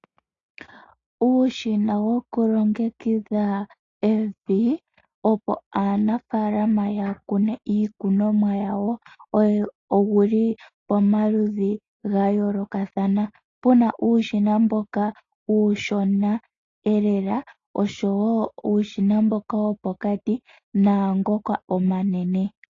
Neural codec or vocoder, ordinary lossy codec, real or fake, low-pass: none; AAC, 32 kbps; real; 7.2 kHz